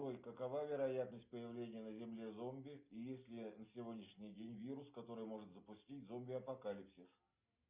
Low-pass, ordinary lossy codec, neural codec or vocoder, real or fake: 3.6 kHz; Opus, 64 kbps; none; real